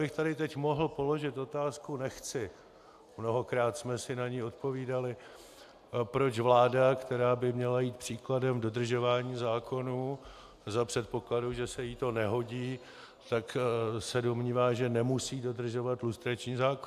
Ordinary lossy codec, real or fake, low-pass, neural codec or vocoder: AAC, 96 kbps; real; 14.4 kHz; none